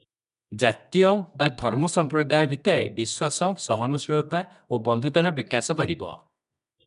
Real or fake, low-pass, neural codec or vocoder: fake; 10.8 kHz; codec, 24 kHz, 0.9 kbps, WavTokenizer, medium music audio release